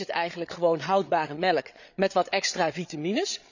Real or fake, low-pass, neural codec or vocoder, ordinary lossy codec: fake; 7.2 kHz; codec, 16 kHz, 8 kbps, FreqCodec, larger model; none